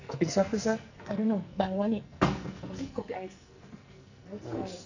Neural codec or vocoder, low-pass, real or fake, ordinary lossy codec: codec, 44.1 kHz, 2.6 kbps, SNAC; 7.2 kHz; fake; none